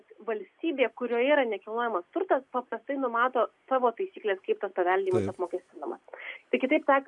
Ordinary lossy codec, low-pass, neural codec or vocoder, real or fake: MP3, 64 kbps; 10.8 kHz; none; real